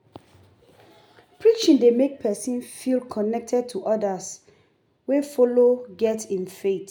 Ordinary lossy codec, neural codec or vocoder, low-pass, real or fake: none; none; 19.8 kHz; real